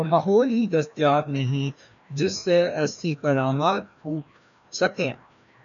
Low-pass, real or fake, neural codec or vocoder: 7.2 kHz; fake; codec, 16 kHz, 1 kbps, FreqCodec, larger model